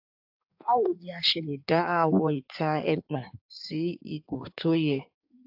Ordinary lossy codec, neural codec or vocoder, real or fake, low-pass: AAC, 48 kbps; codec, 16 kHz in and 24 kHz out, 1.1 kbps, FireRedTTS-2 codec; fake; 5.4 kHz